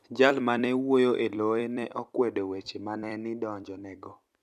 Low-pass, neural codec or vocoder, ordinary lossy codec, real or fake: 14.4 kHz; vocoder, 44.1 kHz, 128 mel bands every 256 samples, BigVGAN v2; none; fake